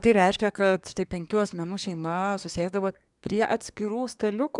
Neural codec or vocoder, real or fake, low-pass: codec, 24 kHz, 1 kbps, SNAC; fake; 10.8 kHz